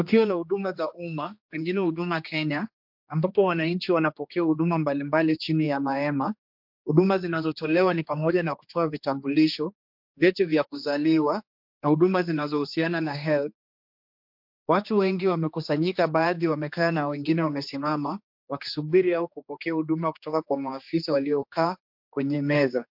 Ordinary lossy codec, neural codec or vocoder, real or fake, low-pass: MP3, 48 kbps; codec, 16 kHz, 2 kbps, X-Codec, HuBERT features, trained on general audio; fake; 5.4 kHz